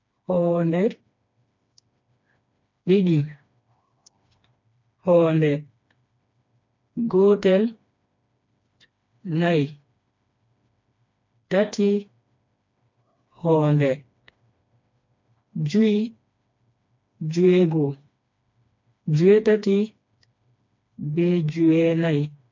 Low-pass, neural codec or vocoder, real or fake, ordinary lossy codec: 7.2 kHz; codec, 16 kHz, 2 kbps, FreqCodec, smaller model; fake; MP3, 48 kbps